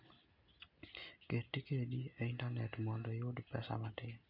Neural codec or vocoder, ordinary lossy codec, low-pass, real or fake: none; none; 5.4 kHz; real